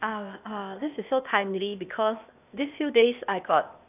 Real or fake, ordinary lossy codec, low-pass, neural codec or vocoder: fake; none; 3.6 kHz; codec, 16 kHz, 0.8 kbps, ZipCodec